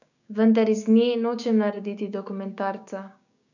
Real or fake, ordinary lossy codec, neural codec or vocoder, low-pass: fake; none; codec, 16 kHz, 6 kbps, DAC; 7.2 kHz